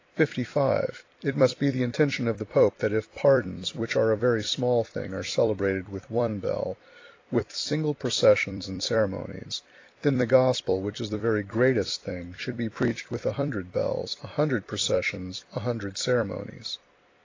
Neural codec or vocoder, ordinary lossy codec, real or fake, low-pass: vocoder, 44.1 kHz, 128 mel bands every 256 samples, BigVGAN v2; AAC, 32 kbps; fake; 7.2 kHz